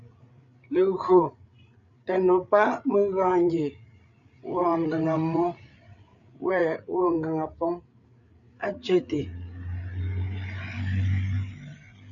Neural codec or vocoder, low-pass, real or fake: codec, 16 kHz, 8 kbps, FreqCodec, larger model; 7.2 kHz; fake